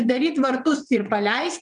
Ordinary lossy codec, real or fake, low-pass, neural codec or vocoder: MP3, 96 kbps; fake; 9.9 kHz; vocoder, 22.05 kHz, 80 mel bands, WaveNeXt